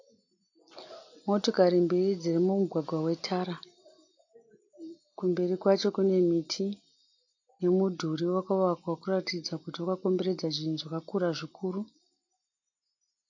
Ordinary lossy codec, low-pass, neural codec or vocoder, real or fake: AAC, 48 kbps; 7.2 kHz; none; real